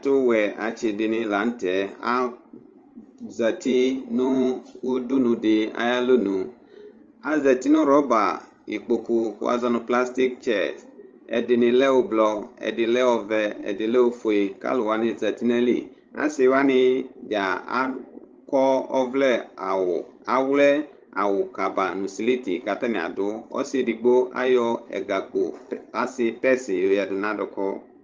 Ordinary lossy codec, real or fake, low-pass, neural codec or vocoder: Opus, 32 kbps; fake; 7.2 kHz; codec, 16 kHz, 16 kbps, FreqCodec, larger model